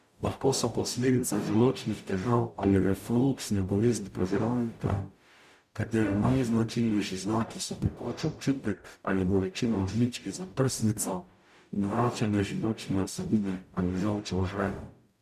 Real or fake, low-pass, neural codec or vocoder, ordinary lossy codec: fake; 14.4 kHz; codec, 44.1 kHz, 0.9 kbps, DAC; none